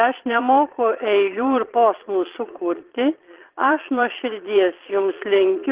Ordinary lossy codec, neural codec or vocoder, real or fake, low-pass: Opus, 16 kbps; vocoder, 22.05 kHz, 80 mel bands, Vocos; fake; 3.6 kHz